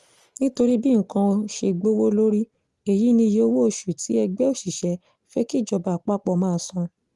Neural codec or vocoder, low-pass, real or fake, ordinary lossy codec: none; 10.8 kHz; real; Opus, 24 kbps